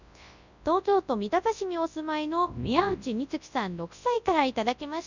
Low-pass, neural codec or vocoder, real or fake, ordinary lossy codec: 7.2 kHz; codec, 24 kHz, 0.9 kbps, WavTokenizer, large speech release; fake; none